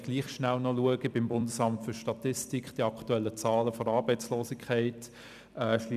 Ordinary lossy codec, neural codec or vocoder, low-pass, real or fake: none; vocoder, 44.1 kHz, 128 mel bands every 256 samples, BigVGAN v2; 14.4 kHz; fake